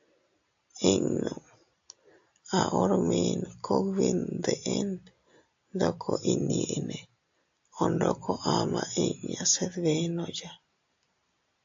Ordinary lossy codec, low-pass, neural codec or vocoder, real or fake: AAC, 64 kbps; 7.2 kHz; none; real